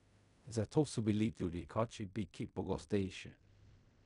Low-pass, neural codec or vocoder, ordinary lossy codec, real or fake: 10.8 kHz; codec, 16 kHz in and 24 kHz out, 0.4 kbps, LongCat-Audio-Codec, fine tuned four codebook decoder; none; fake